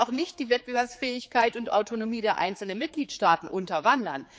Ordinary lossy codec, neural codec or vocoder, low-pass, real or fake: none; codec, 16 kHz, 4 kbps, X-Codec, HuBERT features, trained on general audio; none; fake